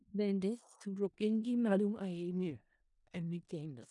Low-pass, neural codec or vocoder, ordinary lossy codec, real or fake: 10.8 kHz; codec, 16 kHz in and 24 kHz out, 0.4 kbps, LongCat-Audio-Codec, four codebook decoder; none; fake